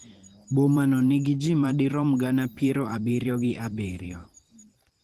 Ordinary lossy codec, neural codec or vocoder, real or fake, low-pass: Opus, 16 kbps; vocoder, 44.1 kHz, 128 mel bands every 512 samples, BigVGAN v2; fake; 14.4 kHz